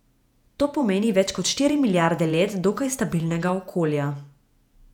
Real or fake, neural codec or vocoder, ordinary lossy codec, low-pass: fake; vocoder, 48 kHz, 128 mel bands, Vocos; none; 19.8 kHz